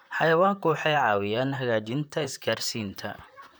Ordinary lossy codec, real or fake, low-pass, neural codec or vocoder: none; fake; none; vocoder, 44.1 kHz, 128 mel bands, Pupu-Vocoder